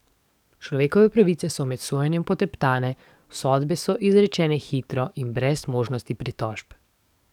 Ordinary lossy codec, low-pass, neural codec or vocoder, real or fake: none; 19.8 kHz; codec, 44.1 kHz, 7.8 kbps, DAC; fake